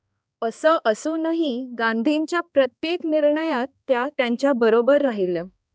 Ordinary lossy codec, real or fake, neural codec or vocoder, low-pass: none; fake; codec, 16 kHz, 2 kbps, X-Codec, HuBERT features, trained on balanced general audio; none